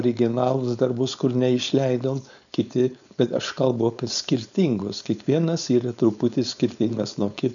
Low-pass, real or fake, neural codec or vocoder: 7.2 kHz; fake; codec, 16 kHz, 4.8 kbps, FACodec